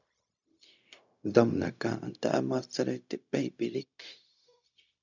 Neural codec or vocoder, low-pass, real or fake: codec, 16 kHz, 0.4 kbps, LongCat-Audio-Codec; 7.2 kHz; fake